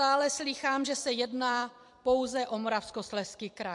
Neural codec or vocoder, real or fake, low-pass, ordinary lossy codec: vocoder, 44.1 kHz, 128 mel bands every 256 samples, BigVGAN v2; fake; 10.8 kHz; MP3, 64 kbps